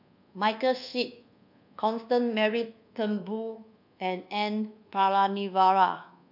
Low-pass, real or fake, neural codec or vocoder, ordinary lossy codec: 5.4 kHz; fake; codec, 24 kHz, 1.2 kbps, DualCodec; none